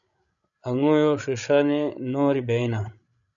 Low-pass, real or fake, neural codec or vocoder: 7.2 kHz; fake; codec, 16 kHz, 16 kbps, FreqCodec, larger model